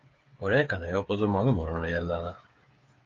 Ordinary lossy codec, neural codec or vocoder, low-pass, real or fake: Opus, 24 kbps; codec, 16 kHz, 8 kbps, FreqCodec, smaller model; 7.2 kHz; fake